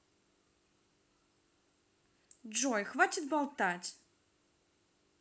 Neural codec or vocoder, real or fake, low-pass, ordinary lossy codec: none; real; none; none